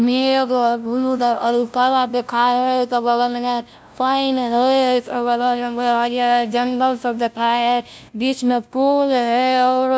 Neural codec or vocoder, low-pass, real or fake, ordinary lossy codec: codec, 16 kHz, 0.5 kbps, FunCodec, trained on LibriTTS, 25 frames a second; none; fake; none